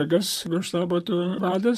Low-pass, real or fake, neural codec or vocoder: 14.4 kHz; fake; vocoder, 44.1 kHz, 128 mel bands, Pupu-Vocoder